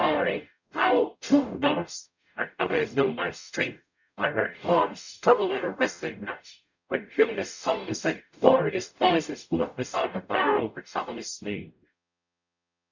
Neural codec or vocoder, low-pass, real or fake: codec, 44.1 kHz, 0.9 kbps, DAC; 7.2 kHz; fake